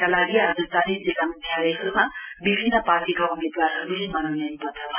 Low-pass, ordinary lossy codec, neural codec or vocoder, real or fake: 3.6 kHz; none; none; real